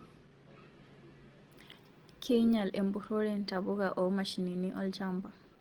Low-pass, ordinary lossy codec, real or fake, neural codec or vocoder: 19.8 kHz; Opus, 16 kbps; real; none